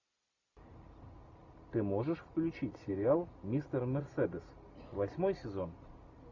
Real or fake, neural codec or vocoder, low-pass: real; none; 7.2 kHz